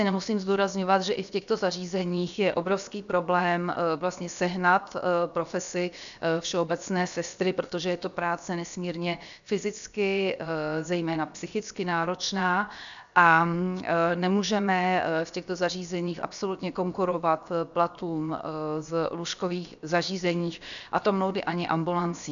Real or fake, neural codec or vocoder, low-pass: fake; codec, 16 kHz, 0.7 kbps, FocalCodec; 7.2 kHz